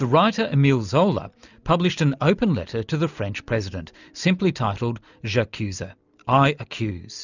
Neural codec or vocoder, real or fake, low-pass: none; real; 7.2 kHz